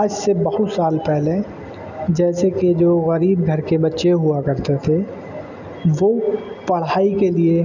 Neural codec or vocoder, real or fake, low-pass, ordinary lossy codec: none; real; 7.2 kHz; none